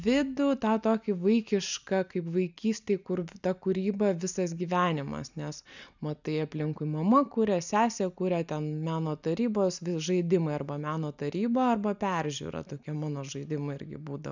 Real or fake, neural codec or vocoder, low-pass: real; none; 7.2 kHz